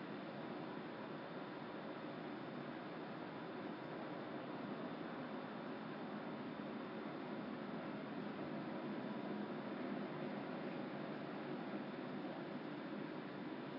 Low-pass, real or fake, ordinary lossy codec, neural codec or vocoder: 5.4 kHz; real; MP3, 32 kbps; none